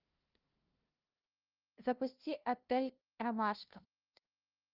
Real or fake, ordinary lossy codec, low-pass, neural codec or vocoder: fake; Opus, 32 kbps; 5.4 kHz; codec, 16 kHz, 0.5 kbps, FunCodec, trained on LibriTTS, 25 frames a second